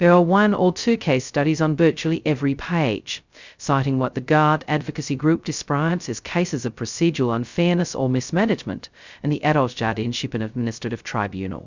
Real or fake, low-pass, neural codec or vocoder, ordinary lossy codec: fake; 7.2 kHz; codec, 16 kHz, 0.2 kbps, FocalCodec; Opus, 64 kbps